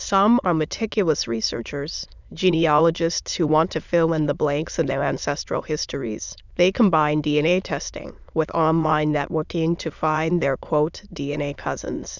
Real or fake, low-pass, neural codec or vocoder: fake; 7.2 kHz; autoencoder, 22.05 kHz, a latent of 192 numbers a frame, VITS, trained on many speakers